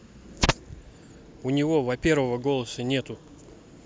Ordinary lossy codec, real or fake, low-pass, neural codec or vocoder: none; real; none; none